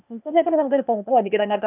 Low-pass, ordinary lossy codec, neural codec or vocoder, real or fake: 3.6 kHz; none; codec, 16 kHz, 0.8 kbps, ZipCodec; fake